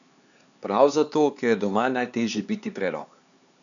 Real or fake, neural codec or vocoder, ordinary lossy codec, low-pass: fake; codec, 16 kHz, 4 kbps, X-Codec, HuBERT features, trained on LibriSpeech; none; 7.2 kHz